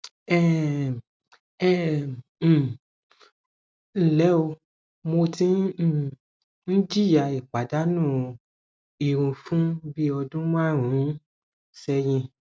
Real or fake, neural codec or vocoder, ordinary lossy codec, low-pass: real; none; none; none